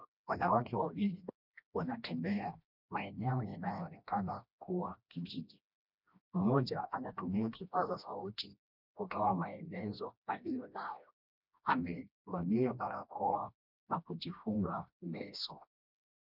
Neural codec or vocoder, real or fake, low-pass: codec, 16 kHz, 1 kbps, FreqCodec, smaller model; fake; 5.4 kHz